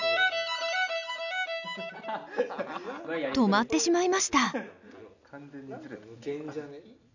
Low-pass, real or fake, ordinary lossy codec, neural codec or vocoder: 7.2 kHz; real; none; none